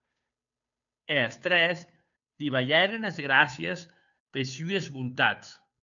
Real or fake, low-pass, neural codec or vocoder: fake; 7.2 kHz; codec, 16 kHz, 2 kbps, FunCodec, trained on Chinese and English, 25 frames a second